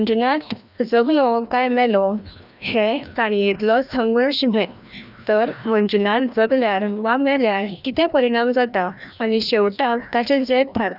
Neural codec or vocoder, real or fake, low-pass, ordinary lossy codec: codec, 16 kHz, 1 kbps, FreqCodec, larger model; fake; 5.4 kHz; none